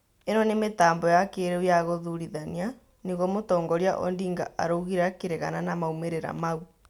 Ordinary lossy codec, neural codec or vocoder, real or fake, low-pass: none; none; real; 19.8 kHz